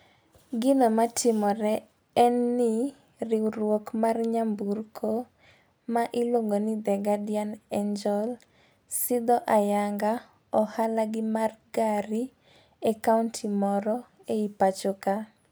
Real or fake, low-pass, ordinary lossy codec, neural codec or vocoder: real; none; none; none